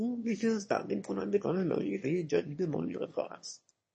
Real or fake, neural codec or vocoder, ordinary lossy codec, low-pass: fake; autoencoder, 22.05 kHz, a latent of 192 numbers a frame, VITS, trained on one speaker; MP3, 32 kbps; 9.9 kHz